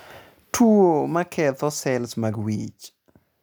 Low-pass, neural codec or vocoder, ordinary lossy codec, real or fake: none; none; none; real